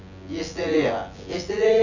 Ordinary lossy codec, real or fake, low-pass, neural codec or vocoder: none; fake; 7.2 kHz; vocoder, 24 kHz, 100 mel bands, Vocos